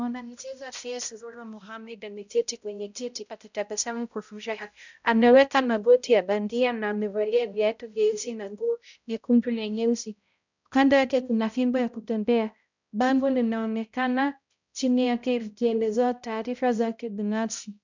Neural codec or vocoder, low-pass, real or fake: codec, 16 kHz, 0.5 kbps, X-Codec, HuBERT features, trained on balanced general audio; 7.2 kHz; fake